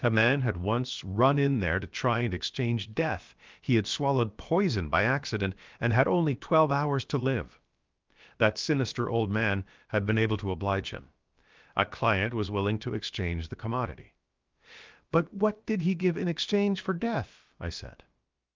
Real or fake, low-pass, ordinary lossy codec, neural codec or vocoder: fake; 7.2 kHz; Opus, 24 kbps; codec, 16 kHz, about 1 kbps, DyCAST, with the encoder's durations